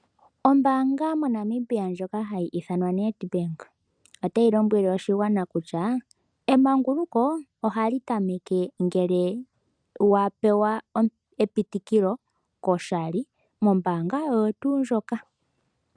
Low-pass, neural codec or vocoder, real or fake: 9.9 kHz; none; real